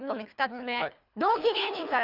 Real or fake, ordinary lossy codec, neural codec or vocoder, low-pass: fake; none; codec, 24 kHz, 3 kbps, HILCodec; 5.4 kHz